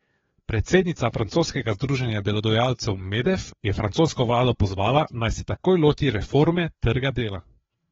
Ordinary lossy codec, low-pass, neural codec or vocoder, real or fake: AAC, 24 kbps; 7.2 kHz; codec, 16 kHz, 4 kbps, FreqCodec, larger model; fake